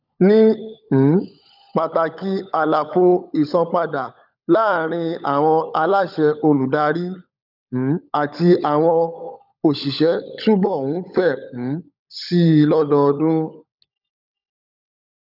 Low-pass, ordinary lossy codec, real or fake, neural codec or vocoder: 5.4 kHz; none; fake; codec, 16 kHz, 16 kbps, FunCodec, trained on LibriTTS, 50 frames a second